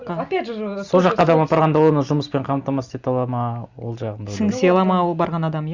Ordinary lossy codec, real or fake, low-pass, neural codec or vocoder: Opus, 64 kbps; real; 7.2 kHz; none